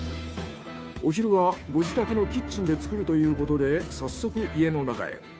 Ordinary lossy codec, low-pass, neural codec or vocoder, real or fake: none; none; codec, 16 kHz, 2 kbps, FunCodec, trained on Chinese and English, 25 frames a second; fake